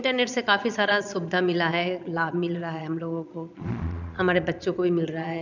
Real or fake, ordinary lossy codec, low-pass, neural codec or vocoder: fake; none; 7.2 kHz; vocoder, 22.05 kHz, 80 mel bands, Vocos